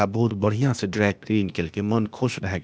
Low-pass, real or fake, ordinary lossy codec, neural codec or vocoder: none; fake; none; codec, 16 kHz, 0.8 kbps, ZipCodec